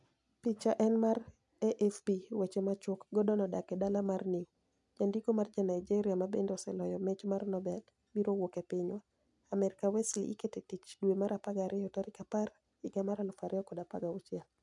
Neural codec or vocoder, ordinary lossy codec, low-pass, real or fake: none; none; 10.8 kHz; real